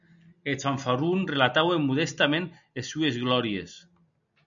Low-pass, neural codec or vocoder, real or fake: 7.2 kHz; none; real